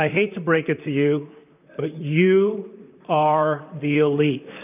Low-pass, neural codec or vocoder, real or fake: 3.6 kHz; vocoder, 44.1 kHz, 128 mel bands, Pupu-Vocoder; fake